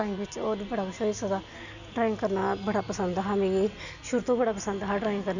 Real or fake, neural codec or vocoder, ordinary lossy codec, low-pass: real; none; none; 7.2 kHz